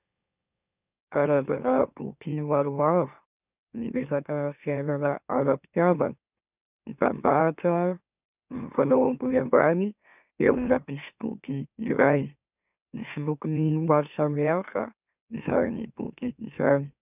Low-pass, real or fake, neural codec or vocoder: 3.6 kHz; fake; autoencoder, 44.1 kHz, a latent of 192 numbers a frame, MeloTTS